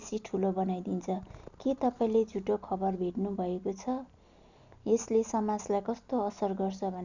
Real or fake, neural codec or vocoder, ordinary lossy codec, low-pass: real; none; none; 7.2 kHz